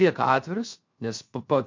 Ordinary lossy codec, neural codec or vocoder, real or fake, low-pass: MP3, 48 kbps; codec, 16 kHz, 0.7 kbps, FocalCodec; fake; 7.2 kHz